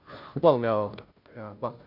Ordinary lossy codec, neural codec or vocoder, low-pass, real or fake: none; codec, 16 kHz, 0.5 kbps, FunCodec, trained on Chinese and English, 25 frames a second; 5.4 kHz; fake